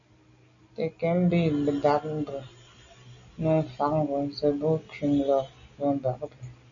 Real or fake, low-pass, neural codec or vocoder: real; 7.2 kHz; none